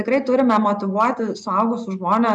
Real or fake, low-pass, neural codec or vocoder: real; 10.8 kHz; none